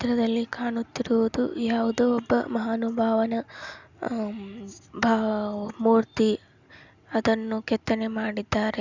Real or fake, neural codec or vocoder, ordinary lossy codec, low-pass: real; none; Opus, 64 kbps; 7.2 kHz